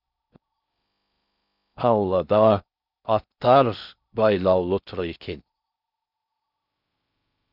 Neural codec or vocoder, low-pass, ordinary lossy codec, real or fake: codec, 16 kHz in and 24 kHz out, 0.6 kbps, FocalCodec, streaming, 4096 codes; 5.4 kHz; none; fake